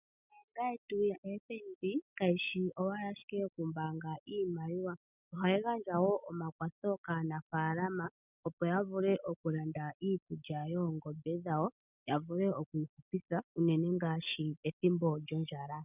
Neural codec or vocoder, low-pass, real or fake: none; 3.6 kHz; real